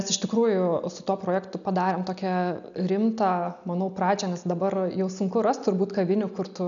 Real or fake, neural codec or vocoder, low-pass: real; none; 7.2 kHz